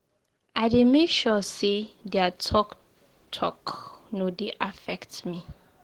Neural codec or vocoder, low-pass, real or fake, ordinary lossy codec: codec, 44.1 kHz, 7.8 kbps, DAC; 19.8 kHz; fake; Opus, 16 kbps